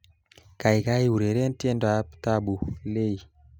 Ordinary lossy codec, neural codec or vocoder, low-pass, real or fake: none; none; none; real